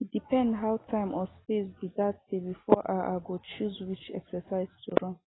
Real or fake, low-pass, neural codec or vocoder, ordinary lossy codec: real; 7.2 kHz; none; AAC, 16 kbps